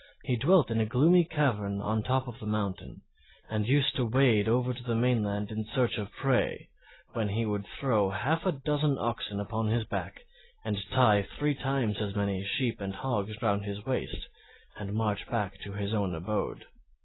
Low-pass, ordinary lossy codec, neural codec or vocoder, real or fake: 7.2 kHz; AAC, 16 kbps; none; real